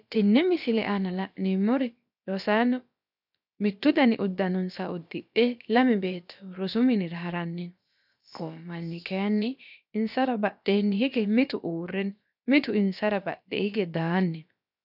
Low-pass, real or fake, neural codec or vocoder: 5.4 kHz; fake; codec, 16 kHz, about 1 kbps, DyCAST, with the encoder's durations